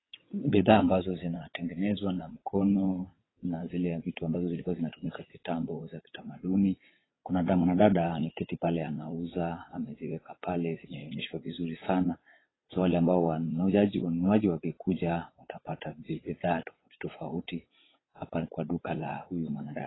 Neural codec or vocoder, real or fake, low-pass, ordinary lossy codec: vocoder, 22.05 kHz, 80 mel bands, WaveNeXt; fake; 7.2 kHz; AAC, 16 kbps